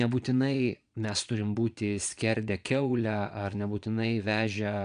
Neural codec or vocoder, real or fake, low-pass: vocoder, 22.05 kHz, 80 mel bands, Vocos; fake; 9.9 kHz